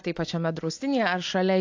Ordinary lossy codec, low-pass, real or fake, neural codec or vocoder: AAC, 48 kbps; 7.2 kHz; real; none